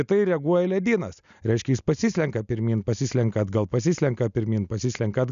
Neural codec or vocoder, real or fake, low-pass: none; real; 7.2 kHz